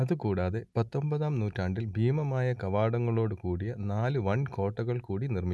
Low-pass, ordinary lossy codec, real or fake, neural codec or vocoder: none; none; real; none